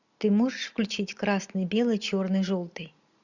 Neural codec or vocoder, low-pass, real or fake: none; 7.2 kHz; real